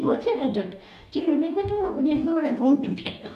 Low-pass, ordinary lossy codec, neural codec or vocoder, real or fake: 14.4 kHz; none; codec, 44.1 kHz, 2.6 kbps, DAC; fake